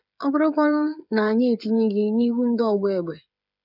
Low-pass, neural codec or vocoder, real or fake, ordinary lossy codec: 5.4 kHz; codec, 16 kHz, 8 kbps, FreqCodec, smaller model; fake; none